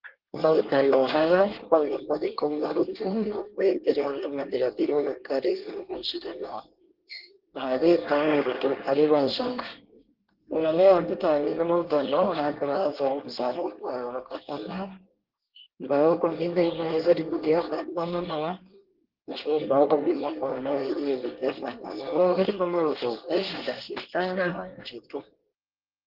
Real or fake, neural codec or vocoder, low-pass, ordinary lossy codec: fake; codec, 24 kHz, 1 kbps, SNAC; 5.4 kHz; Opus, 16 kbps